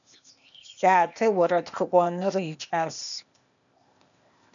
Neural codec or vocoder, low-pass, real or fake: codec, 16 kHz, 0.8 kbps, ZipCodec; 7.2 kHz; fake